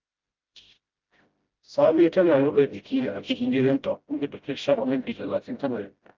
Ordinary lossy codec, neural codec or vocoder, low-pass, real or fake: Opus, 24 kbps; codec, 16 kHz, 0.5 kbps, FreqCodec, smaller model; 7.2 kHz; fake